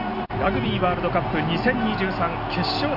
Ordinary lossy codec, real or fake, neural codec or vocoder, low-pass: none; real; none; 5.4 kHz